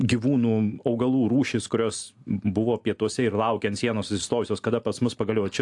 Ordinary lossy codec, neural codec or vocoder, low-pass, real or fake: AAC, 64 kbps; none; 10.8 kHz; real